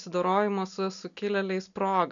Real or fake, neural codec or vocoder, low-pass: real; none; 7.2 kHz